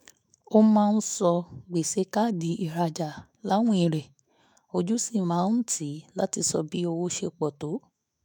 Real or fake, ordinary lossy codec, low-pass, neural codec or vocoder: fake; none; none; autoencoder, 48 kHz, 128 numbers a frame, DAC-VAE, trained on Japanese speech